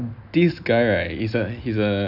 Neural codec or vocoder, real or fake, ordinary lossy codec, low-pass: none; real; none; 5.4 kHz